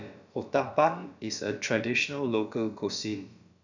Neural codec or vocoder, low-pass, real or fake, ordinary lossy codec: codec, 16 kHz, about 1 kbps, DyCAST, with the encoder's durations; 7.2 kHz; fake; none